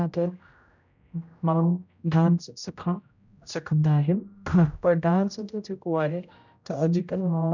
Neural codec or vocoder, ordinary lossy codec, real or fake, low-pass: codec, 16 kHz, 0.5 kbps, X-Codec, HuBERT features, trained on general audio; none; fake; 7.2 kHz